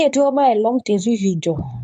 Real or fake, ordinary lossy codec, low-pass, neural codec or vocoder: fake; none; 10.8 kHz; codec, 24 kHz, 0.9 kbps, WavTokenizer, medium speech release version 2